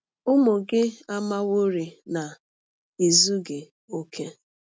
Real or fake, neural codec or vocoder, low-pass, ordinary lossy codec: real; none; none; none